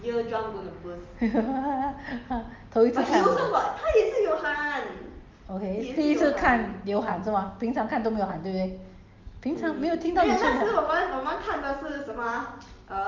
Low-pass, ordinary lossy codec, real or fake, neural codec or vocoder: 7.2 kHz; Opus, 32 kbps; real; none